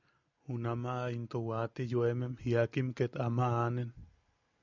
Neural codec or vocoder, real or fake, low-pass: none; real; 7.2 kHz